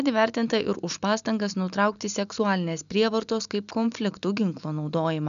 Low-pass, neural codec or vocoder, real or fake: 7.2 kHz; none; real